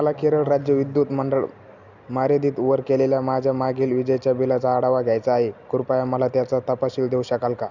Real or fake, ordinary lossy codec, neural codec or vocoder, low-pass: real; none; none; 7.2 kHz